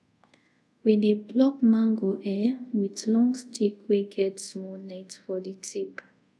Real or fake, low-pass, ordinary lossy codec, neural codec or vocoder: fake; none; none; codec, 24 kHz, 0.5 kbps, DualCodec